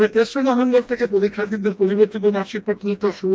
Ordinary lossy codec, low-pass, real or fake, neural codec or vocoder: none; none; fake; codec, 16 kHz, 1 kbps, FreqCodec, smaller model